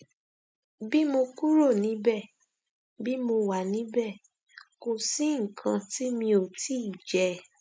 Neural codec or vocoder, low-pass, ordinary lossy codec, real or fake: none; none; none; real